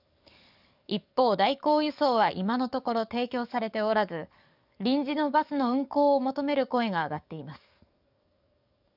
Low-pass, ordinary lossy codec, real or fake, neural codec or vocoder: 5.4 kHz; none; fake; codec, 44.1 kHz, 7.8 kbps, DAC